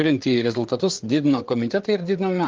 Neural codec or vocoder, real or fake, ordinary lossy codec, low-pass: codec, 16 kHz, 4 kbps, FreqCodec, larger model; fake; Opus, 32 kbps; 7.2 kHz